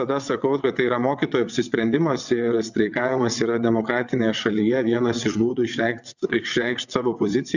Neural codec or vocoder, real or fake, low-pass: vocoder, 22.05 kHz, 80 mel bands, WaveNeXt; fake; 7.2 kHz